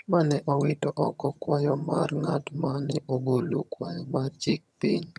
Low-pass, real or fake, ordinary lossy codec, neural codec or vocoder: none; fake; none; vocoder, 22.05 kHz, 80 mel bands, HiFi-GAN